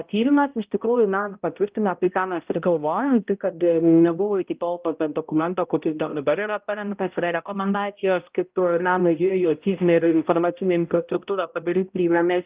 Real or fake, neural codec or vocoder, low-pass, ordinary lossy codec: fake; codec, 16 kHz, 0.5 kbps, X-Codec, HuBERT features, trained on balanced general audio; 3.6 kHz; Opus, 24 kbps